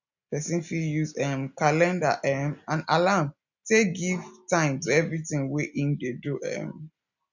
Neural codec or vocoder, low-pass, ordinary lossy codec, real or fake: none; 7.2 kHz; none; real